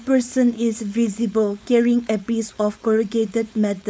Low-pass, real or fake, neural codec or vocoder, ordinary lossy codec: none; fake; codec, 16 kHz, 4.8 kbps, FACodec; none